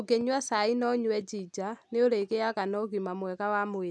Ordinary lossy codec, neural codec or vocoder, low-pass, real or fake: none; none; none; real